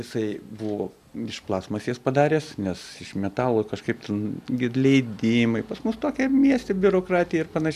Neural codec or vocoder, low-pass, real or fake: none; 14.4 kHz; real